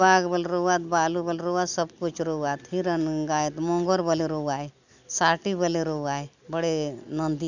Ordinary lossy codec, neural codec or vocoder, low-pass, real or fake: none; none; 7.2 kHz; real